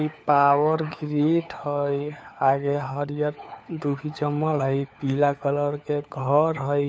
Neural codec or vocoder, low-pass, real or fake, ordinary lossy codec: codec, 16 kHz, 4 kbps, FunCodec, trained on LibriTTS, 50 frames a second; none; fake; none